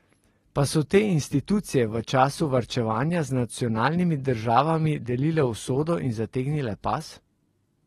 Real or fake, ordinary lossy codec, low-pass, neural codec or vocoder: real; AAC, 32 kbps; 19.8 kHz; none